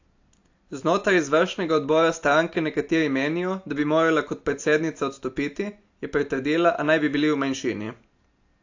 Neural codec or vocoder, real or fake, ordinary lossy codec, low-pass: none; real; AAC, 48 kbps; 7.2 kHz